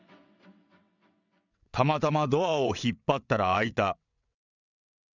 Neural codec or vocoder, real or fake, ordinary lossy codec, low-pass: vocoder, 22.05 kHz, 80 mel bands, WaveNeXt; fake; none; 7.2 kHz